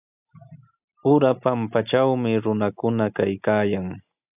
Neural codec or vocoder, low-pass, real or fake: none; 3.6 kHz; real